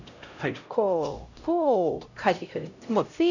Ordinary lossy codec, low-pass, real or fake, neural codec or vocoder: none; 7.2 kHz; fake; codec, 16 kHz, 0.5 kbps, X-Codec, HuBERT features, trained on LibriSpeech